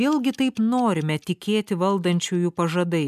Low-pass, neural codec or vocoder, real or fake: 14.4 kHz; none; real